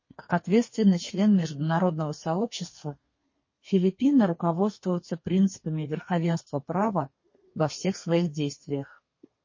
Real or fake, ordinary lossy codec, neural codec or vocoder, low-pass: fake; MP3, 32 kbps; codec, 32 kHz, 1.9 kbps, SNAC; 7.2 kHz